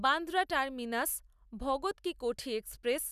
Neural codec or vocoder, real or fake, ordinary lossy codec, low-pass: none; real; none; 14.4 kHz